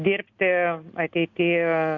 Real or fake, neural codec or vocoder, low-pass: real; none; 7.2 kHz